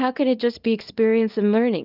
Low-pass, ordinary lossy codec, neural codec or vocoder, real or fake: 5.4 kHz; Opus, 24 kbps; codec, 24 kHz, 0.9 kbps, WavTokenizer, small release; fake